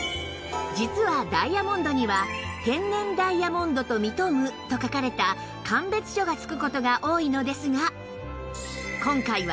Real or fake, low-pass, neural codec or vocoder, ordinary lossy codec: real; none; none; none